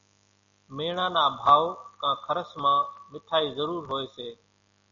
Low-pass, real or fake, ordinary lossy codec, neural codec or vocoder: 7.2 kHz; real; MP3, 96 kbps; none